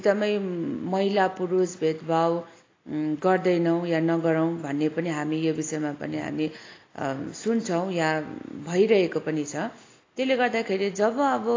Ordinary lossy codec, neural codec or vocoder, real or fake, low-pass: AAC, 32 kbps; none; real; 7.2 kHz